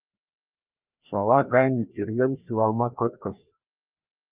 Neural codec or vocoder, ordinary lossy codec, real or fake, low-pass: codec, 16 kHz, 1 kbps, FreqCodec, larger model; Opus, 24 kbps; fake; 3.6 kHz